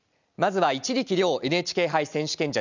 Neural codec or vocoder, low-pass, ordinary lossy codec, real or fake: none; 7.2 kHz; none; real